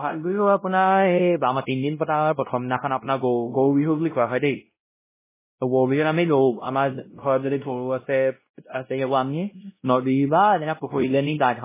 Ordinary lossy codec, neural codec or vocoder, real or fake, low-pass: MP3, 16 kbps; codec, 16 kHz, 0.5 kbps, X-Codec, WavLM features, trained on Multilingual LibriSpeech; fake; 3.6 kHz